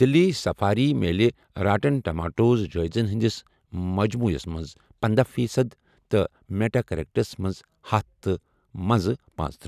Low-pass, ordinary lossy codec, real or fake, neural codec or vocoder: 14.4 kHz; none; real; none